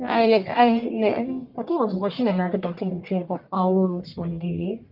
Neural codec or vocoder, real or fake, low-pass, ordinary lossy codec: codec, 44.1 kHz, 1.7 kbps, Pupu-Codec; fake; 5.4 kHz; Opus, 24 kbps